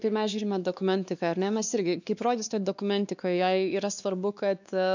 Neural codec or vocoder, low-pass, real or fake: codec, 16 kHz, 2 kbps, X-Codec, WavLM features, trained on Multilingual LibriSpeech; 7.2 kHz; fake